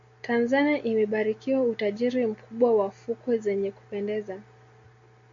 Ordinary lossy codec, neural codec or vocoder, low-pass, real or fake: MP3, 48 kbps; none; 7.2 kHz; real